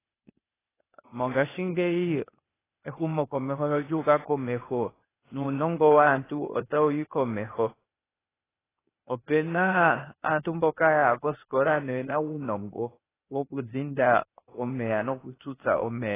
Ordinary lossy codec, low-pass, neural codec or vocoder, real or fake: AAC, 16 kbps; 3.6 kHz; codec, 16 kHz, 0.8 kbps, ZipCodec; fake